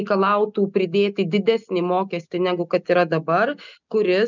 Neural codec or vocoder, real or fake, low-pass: none; real; 7.2 kHz